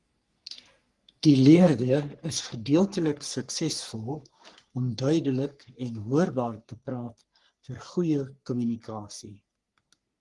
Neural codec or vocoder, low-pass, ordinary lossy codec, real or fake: codec, 44.1 kHz, 3.4 kbps, Pupu-Codec; 10.8 kHz; Opus, 24 kbps; fake